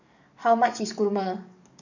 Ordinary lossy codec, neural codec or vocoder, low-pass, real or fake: none; codec, 44.1 kHz, 7.8 kbps, DAC; 7.2 kHz; fake